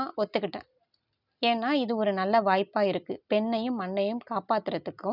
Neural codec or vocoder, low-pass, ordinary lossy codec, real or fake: none; 5.4 kHz; none; real